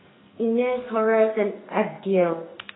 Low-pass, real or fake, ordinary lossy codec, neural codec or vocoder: 7.2 kHz; fake; AAC, 16 kbps; codec, 44.1 kHz, 2.6 kbps, SNAC